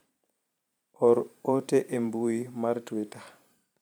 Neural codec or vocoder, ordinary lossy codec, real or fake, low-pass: vocoder, 44.1 kHz, 128 mel bands every 512 samples, BigVGAN v2; none; fake; none